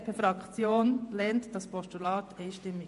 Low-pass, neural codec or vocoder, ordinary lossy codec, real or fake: 14.4 kHz; vocoder, 48 kHz, 128 mel bands, Vocos; MP3, 48 kbps; fake